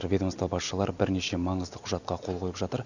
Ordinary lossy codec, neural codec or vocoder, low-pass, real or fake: none; none; 7.2 kHz; real